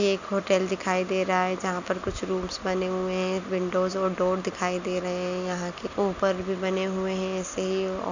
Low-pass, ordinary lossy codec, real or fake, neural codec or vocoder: 7.2 kHz; none; real; none